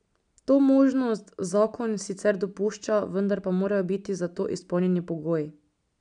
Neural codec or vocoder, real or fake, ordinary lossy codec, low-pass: none; real; none; 9.9 kHz